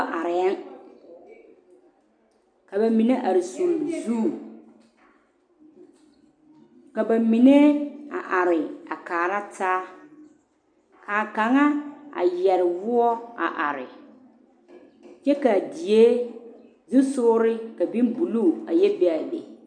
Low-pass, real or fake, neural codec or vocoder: 9.9 kHz; real; none